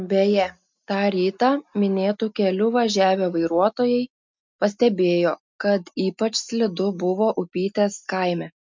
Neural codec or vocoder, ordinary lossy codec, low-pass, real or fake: none; MP3, 64 kbps; 7.2 kHz; real